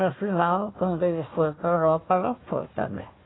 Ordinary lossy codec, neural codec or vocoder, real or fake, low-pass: AAC, 16 kbps; codec, 16 kHz, 1 kbps, FunCodec, trained on Chinese and English, 50 frames a second; fake; 7.2 kHz